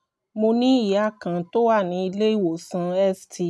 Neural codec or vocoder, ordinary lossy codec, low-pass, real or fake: none; none; none; real